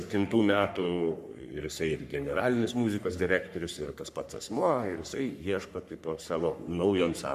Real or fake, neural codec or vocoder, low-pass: fake; codec, 44.1 kHz, 3.4 kbps, Pupu-Codec; 14.4 kHz